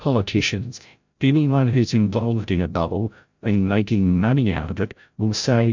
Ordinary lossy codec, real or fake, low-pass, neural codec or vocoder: MP3, 64 kbps; fake; 7.2 kHz; codec, 16 kHz, 0.5 kbps, FreqCodec, larger model